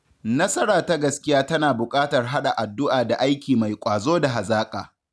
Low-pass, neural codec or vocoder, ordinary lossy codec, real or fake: none; none; none; real